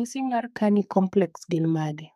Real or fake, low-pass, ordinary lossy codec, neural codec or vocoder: fake; 14.4 kHz; none; codec, 32 kHz, 1.9 kbps, SNAC